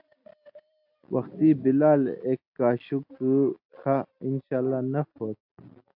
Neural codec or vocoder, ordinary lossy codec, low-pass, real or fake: none; AAC, 48 kbps; 5.4 kHz; real